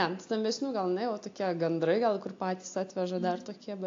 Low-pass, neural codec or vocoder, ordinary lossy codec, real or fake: 7.2 kHz; none; AAC, 48 kbps; real